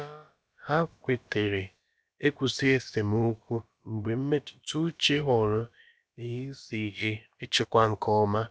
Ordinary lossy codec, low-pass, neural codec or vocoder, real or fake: none; none; codec, 16 kHz, about 1 kbps, DyCAST, with the encoder's durations; fake